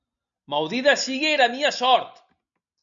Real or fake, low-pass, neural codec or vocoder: real; 7.2 kHz; none